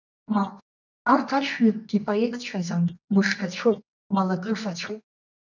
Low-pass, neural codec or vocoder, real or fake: 7.2 kHz; codec, 24 kHz, 0.9 kbps, WavTokenizer, medium music audio release; fake